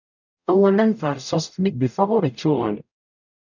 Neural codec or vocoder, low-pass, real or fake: codec, 44.1 kHz, 0.9 kbps, DAC; 7.2 kHz; fake